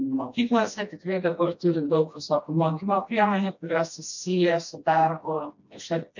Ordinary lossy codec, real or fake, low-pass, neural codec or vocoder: MP3, 48 kbps; fake; 7.2 kHz; codec, 16 kHz, 1 kbps, FreqCodec, smaller model